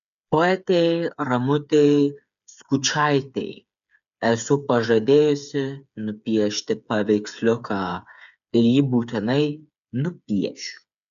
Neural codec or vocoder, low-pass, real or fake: codec, 16 kHz, 8 kbps, FreqCodec, smaller model; 7.2 kHz; fake